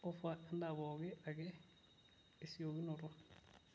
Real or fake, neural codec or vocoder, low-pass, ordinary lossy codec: real; none; none; none